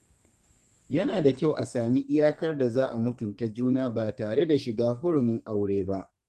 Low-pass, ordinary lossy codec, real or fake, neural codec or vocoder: 14.4 kHz; Opus, 32 kbps; fake; codec, 32 kHz, 1.9 kbps, SNAC